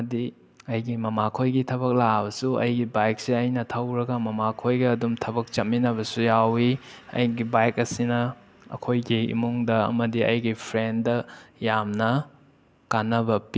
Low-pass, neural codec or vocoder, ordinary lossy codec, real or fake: none; none; none; real